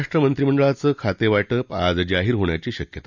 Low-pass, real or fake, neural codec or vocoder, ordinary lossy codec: 7.2 kHz; real; none; none